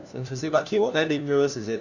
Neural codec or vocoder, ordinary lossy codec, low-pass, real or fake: codec, 16 kHz, 1 kbps, FunCodec, trained on LibriTTS, 50 frames a second; none; 7.2 kHz; fake